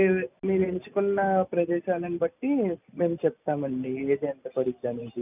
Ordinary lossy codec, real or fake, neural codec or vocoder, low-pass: none; real; none; 3.6 kHz